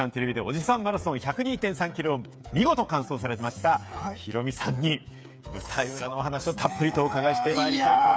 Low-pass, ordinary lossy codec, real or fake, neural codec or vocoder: none; none; fake; codec, 16 kHz, 8 kbps, FreqCodec, smaller model